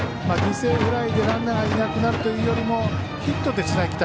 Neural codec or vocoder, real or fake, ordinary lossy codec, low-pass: none; real; none; none